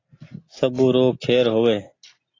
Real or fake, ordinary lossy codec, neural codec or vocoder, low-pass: real; AAC, 32 kbps; none; 7.2 kHz